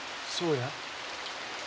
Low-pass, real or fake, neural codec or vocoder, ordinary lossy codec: none; real; none; none